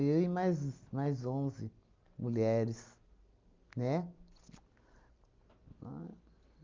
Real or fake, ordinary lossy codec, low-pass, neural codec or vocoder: real; Opus, 24 kbps; 7.2 kHz; none